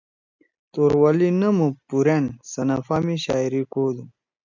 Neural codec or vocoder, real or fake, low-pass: none; real; 7.2 kHz